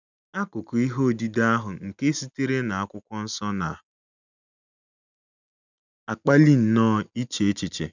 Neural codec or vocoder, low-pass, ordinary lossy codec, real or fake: none; 7.2 kHz; none; real